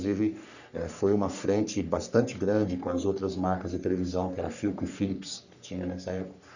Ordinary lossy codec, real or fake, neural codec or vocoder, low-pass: none; fake; codec, 44.1 kHz, 3.4 kbps, Pupu-Codec; 7.2 kHz